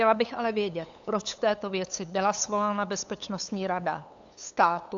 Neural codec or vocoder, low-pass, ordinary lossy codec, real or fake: codec, 16 kHz, 8 kbps, FunCodec, trained on LibriTTS, 25 frames a second; 7.2 kHz; AAC, 64 kbps; fake